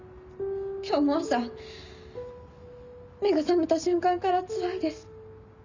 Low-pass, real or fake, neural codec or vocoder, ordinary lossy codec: 7.2 kHz; real; none; Opus, 64 kbps